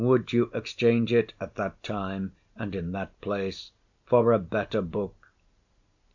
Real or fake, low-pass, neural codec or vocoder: real; 7.2 kHz; none